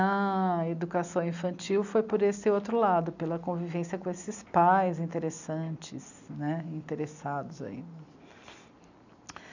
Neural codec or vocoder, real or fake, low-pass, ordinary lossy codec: none; real; 7.2 kHz; none